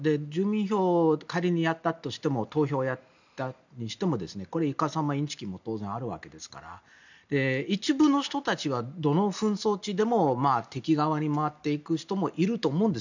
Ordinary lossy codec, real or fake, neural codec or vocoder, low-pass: none; real; none; 7.2 kHz